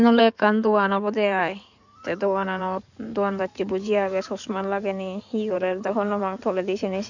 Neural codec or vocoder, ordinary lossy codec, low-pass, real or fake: codec, 16 kHz in and 24 kHz out, 2.2 kbps, FireRedTTS-2 codec; none; 7.2 kHz; fake